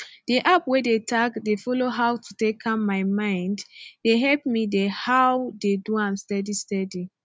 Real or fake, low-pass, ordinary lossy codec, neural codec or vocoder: real; none; none; none